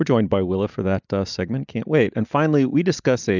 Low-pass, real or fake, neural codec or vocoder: 7.2 kHz; real; none